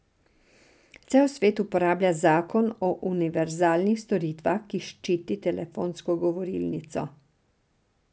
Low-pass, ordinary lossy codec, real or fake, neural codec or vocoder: none; none; real; none